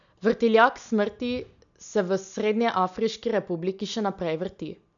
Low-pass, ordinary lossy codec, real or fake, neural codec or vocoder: 7.2 kHz; none; real; none